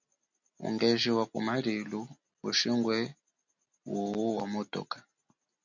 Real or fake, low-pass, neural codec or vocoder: real; 7.2 kHz; none